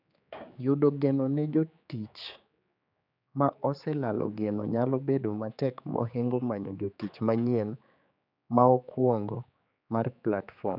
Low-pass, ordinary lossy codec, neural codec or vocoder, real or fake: 5.4 kHz; none; codec, 16 kHz, 4 kbps, X-Codec, HuBERT features, trained on general audio; fake